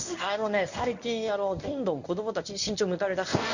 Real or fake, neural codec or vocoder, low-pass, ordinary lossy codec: fake; codec, 24 kHz, 0.9 kbps, WavTokenizer, medium speech release version 1; 7.2 kHz; none